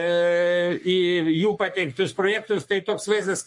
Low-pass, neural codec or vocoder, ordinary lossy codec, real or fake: 10.8 kHz; codec, 44.1 kHz, 3.4 kbps, Pupu-Codec; MP3, 48 kbps; fake